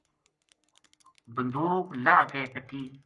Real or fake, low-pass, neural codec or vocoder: fake; 10.8 kHz; codec, 44.1 kHz, 3.4 kbps, Pupu-Codec